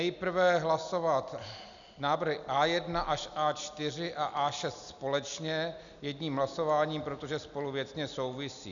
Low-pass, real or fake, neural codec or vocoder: 7.2 kHz; real; none